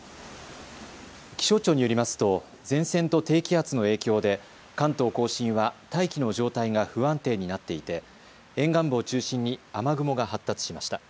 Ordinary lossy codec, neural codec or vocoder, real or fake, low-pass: none; none; real; none